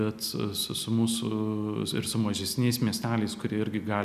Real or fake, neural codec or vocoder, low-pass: real; none; 14.4 kHz